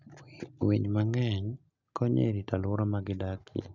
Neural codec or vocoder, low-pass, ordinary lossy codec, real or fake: none; 7.2 kHz; Opus, 64 kbps; real